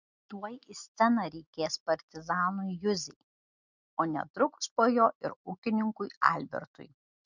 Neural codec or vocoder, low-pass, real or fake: none; 7.2 kHz; real